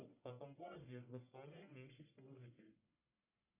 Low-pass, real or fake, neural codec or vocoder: 3.6 kHz; fake; codec, 44.1 kHz, 1.7 kbps, Pupu-Codec